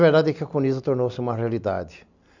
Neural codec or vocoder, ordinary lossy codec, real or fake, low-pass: none; none; real; 7.2 kHz